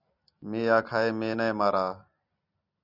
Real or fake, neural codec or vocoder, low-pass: real; none; 5.4 kHz